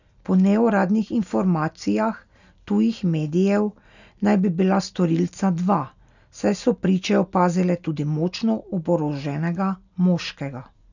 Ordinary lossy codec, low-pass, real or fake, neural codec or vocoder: none; 7.2 kHz; real; none